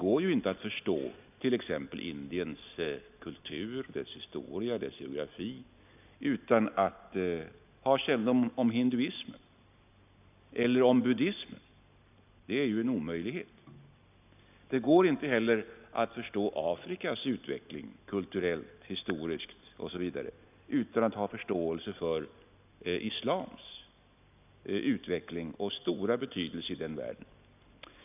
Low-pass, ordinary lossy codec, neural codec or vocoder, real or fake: 3.6 kHz; none; none; real